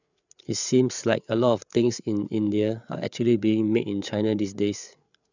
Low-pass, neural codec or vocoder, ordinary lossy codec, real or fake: 7.2 kHz; codec, 16 kHz, 8 kbps, FreqCodec, larger model; none; fake